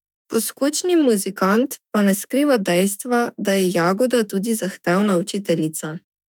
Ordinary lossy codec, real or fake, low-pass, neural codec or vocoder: none; fake; 19.8 kHz; autoencoder, 48 kHz, 32 numbers a frame, DAC-VAE, trained on Japanese speech